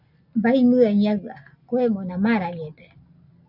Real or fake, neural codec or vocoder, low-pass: real; none; 5.4 kHz